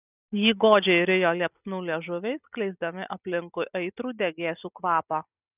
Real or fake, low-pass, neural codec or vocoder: real; 3.6 kHz; none